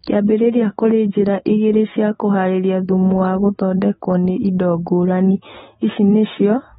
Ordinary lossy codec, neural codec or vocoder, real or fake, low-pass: AAC, 16 kbps; autoencoder, 48 kHz, 128 numbers a frame, DAC-VAE, trained on Japanese speech; fake; 19.8 kHz